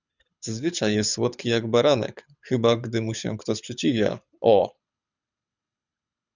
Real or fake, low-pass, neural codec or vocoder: fake; 7.2 kHz; codec, 24 kHz, 6 kbps, HILCodec